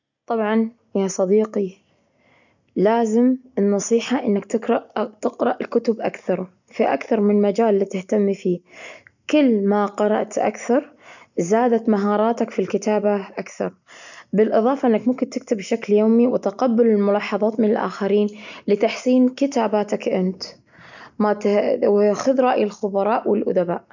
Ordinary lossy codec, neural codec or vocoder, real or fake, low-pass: none; none; real; 7.2 kHz